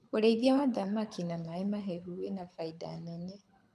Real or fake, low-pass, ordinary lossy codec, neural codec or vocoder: fake; none; none; codec, 24 kHz, 6 kbps, HILCodec